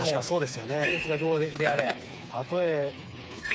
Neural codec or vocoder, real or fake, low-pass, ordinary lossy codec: codec, 16 kHz, 4 kbps, FreqCodec, smaller model; fake; none; none